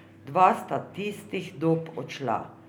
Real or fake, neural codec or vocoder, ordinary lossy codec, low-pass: real; none; none; none